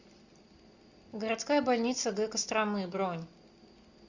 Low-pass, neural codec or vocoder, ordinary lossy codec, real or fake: 7.2 kHz; none; Opus, 64 kbps; real